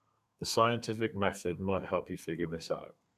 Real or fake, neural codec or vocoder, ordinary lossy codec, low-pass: fake; codec, 32 kHz, 1.9 kbps, SNAC; none; 14.4 kHz